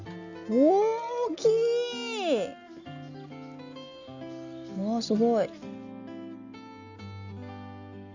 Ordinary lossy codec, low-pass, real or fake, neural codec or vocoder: Opus, 64 kbps; 7.2 kHz; real; none